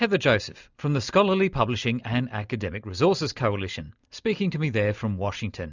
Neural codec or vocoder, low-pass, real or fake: none; 7.2 kHz; real